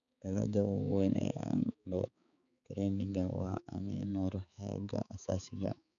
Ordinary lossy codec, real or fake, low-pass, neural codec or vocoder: none; fake; 7.2 kHz; codec, 16 kHz, 4 kbps, X-Codec, HuBERT features, trained on balanced general audio